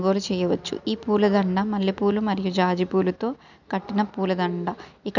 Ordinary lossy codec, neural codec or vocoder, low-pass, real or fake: none; none; 7.2 kHz; real